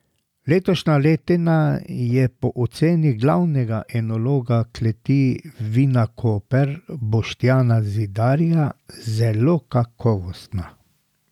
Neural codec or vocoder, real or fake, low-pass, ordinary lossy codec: none; real; 19.8 kHz; none